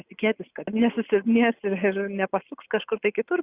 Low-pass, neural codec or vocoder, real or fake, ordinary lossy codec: 3.6 kHz; none; real; Opus, 64 kbps